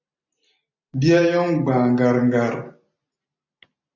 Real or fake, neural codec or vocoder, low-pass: real; none; 7.2 kHz